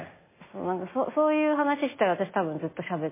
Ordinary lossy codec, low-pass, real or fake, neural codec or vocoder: MP3, 16 kbps; 3.6 kHz; real; none